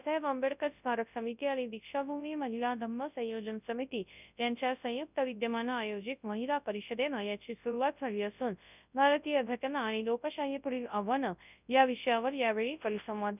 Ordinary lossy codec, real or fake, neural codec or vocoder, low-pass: none; fake; codec, 24 kHz, 0.9 kbps, WavTokenizer, large speech release; 3.6 kHz